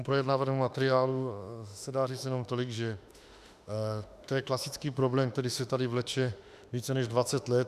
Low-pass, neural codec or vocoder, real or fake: 14.4 kHz; autoencoder, 48 kHz, 32 numbers a frame, DAC-VAE, trained on Japanese speech; fake